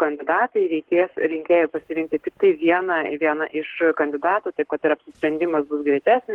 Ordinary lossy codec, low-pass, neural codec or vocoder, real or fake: Opus, 16 kbps; 19.8 kHz; codec, 44.1 kHz, 7.8 kbps, Pupu-Codec; fake